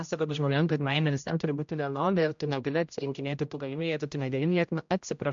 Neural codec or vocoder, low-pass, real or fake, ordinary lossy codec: codec, 16 kHz, 0.5 kbps, X-Codec, HuBERT features, trained on general audio; 7.2 kHz; fake; MP3, 64 kbps